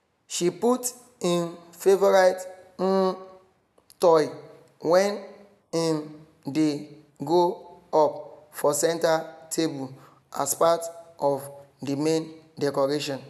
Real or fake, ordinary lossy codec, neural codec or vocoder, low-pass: real; none; none; 14.4 kHz